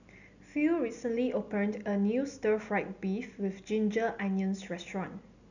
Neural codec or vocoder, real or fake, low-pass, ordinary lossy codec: none; real; 7.2 kHz; none